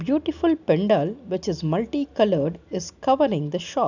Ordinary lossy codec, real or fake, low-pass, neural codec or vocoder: none; real; 7.2 kHz; none